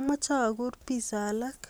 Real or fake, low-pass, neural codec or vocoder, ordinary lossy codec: real; none; none; none